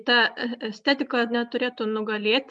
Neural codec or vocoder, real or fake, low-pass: none; real; 10.8 kHz